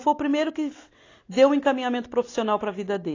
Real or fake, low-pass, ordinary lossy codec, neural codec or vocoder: real; 7.2 kHz; AAC, 32 kbps; none